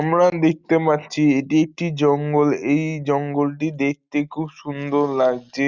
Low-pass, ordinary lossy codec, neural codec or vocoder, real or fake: 7.2 kHz; Opus, 64 kbps; none; real